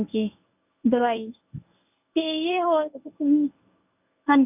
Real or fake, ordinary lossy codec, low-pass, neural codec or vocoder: fake; none; 3.6 kHz; codec, 24 kHz, 0.9 kbps, WavTokenizer, medium speech release version 1